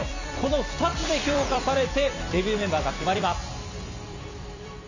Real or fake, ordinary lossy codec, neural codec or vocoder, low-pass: fake; none; vocoder, 44.1 kHz, 128 mel bands every 256 samples, BigVGAN v2; 7.2 kHz